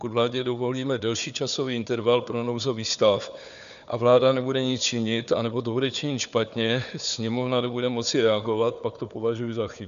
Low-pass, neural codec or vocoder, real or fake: 7.2 kHz; codec, 16 kHz, 4 kbps, FunCodec, trained on Chinese and English, 50 frames a second; fake